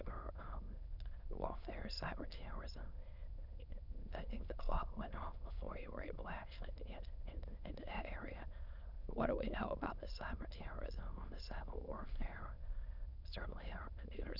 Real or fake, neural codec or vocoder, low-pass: fake; autoencoder, 22.05 kHz, a latent of 192 numbers a frame, VITS, trained on many speakers; 5.4 kHz